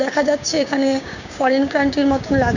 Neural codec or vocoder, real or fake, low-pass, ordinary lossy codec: codec, 16 kHz, 6 kbps, DAC; fake; 7.2 kHz; none